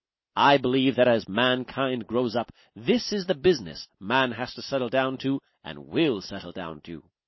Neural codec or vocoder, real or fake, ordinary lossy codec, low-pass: none; real; MP3, 24 kbps; 7.2 kHz